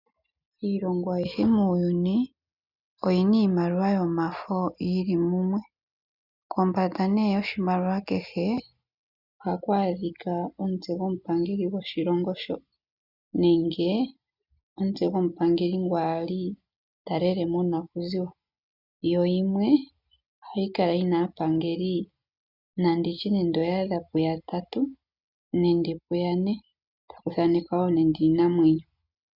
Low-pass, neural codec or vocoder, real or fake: 5.4 kHz; none; real